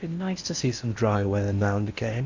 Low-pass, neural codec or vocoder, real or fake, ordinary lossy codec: 7.2 kHz; codec, 16 kHz in and 24 kHz out, 0.6 kbps, FocalCodec, streaming, 4096 codes; fake; Opus, 64 kbps